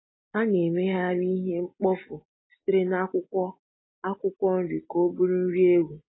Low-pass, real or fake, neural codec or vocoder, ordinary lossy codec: 7.2 kHz; real; none; AAC, 16 kbps